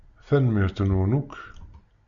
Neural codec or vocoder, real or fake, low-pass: none; real; 7.2 kHz